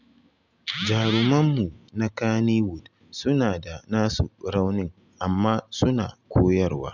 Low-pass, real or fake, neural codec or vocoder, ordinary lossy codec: 7.2 kHz; real; none; none